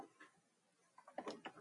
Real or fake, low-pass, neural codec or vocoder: real; 10.8 kHz; none